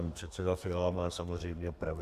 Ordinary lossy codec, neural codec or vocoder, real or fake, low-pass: AAC, 96 kbps; codec, 32 kHz, 1.9 kbps, SNAC; fake; 14.4 kHz